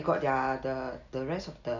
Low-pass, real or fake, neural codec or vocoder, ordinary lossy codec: 7.2 kHz; real; none; none